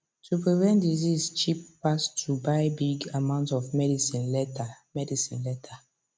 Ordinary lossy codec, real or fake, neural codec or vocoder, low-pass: none; real; none; none